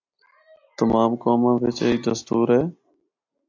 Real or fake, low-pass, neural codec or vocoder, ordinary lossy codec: real; 7.2 kHz; none; AAC, 48 kbps